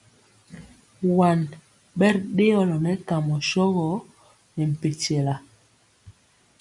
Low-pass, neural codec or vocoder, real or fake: 10.8 kHz; none; real